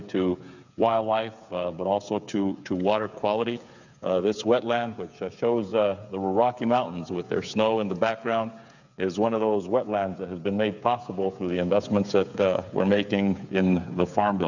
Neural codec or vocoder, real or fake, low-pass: codec, 16 kHz, 8 kbps, FreqCodec, smaller model; fake; 7.2 kHz